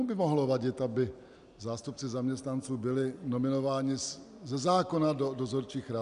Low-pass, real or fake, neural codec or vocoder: 10.8 kHz; real; none